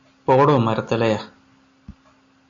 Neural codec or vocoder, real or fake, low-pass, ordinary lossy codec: none; real; 7.2 kHz; AAC, 64 kbps